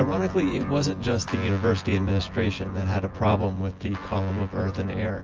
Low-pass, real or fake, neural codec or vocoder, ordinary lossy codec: 7.2 kHz; fake; vocoder, 24 kHz, 100 mel bands, Vocos; Opus, 24 kbps